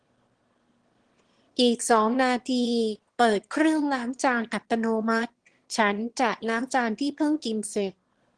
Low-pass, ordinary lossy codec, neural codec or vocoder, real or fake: 9.9 kHz; Opus, 16 kbps; autoencoder, 22.05 kHz, a latent of 192 numbers a frame, VITS, trained on one speaker; fake